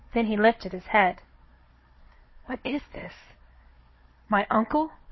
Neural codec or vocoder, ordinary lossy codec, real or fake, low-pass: codec, 16 kHz, 4 kbps, FunCodec, trained on Chinese and English, 50 frames a second; MP3, 24 kbps; fake; 7.2 kHz